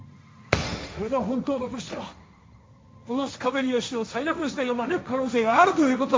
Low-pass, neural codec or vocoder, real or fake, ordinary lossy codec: none; codec, 16 kHz, 1.1 kbps, Voila-Tokenizer; fake; none